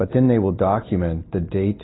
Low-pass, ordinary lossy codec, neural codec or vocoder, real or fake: 7.2 kHz; AAC, 16 kbps; none; real